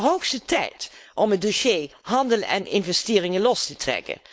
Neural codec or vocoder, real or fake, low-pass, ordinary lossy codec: codec, 16 kHz, 4.8 kbps, FACodec; fake; none; none